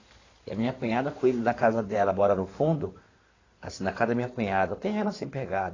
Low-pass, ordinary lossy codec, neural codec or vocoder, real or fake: 7.2 kHz; AAC, 32 kbps; codec, 16 kHz in and 24 kHz out, 2.2 kbps, FireRedTTS-2 codec; fake